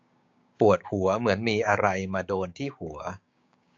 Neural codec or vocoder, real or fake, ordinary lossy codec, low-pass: codec, 16 kHz, 6 kbps, DAC; fake; AAC, 48 kbps; 7.2 kHz